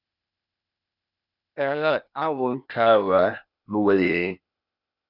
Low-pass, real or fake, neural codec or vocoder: 5.4 kHz; fake; codec, 16 kHz, 0.8 kbps, ZipCodec